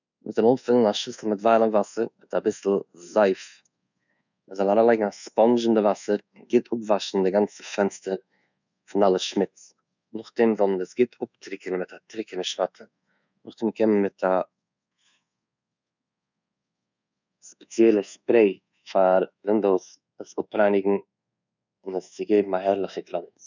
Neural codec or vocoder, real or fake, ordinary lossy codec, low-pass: codec, 24 kHz, 1.2 kbps, DualCodec; fake; none; 7.2 kHz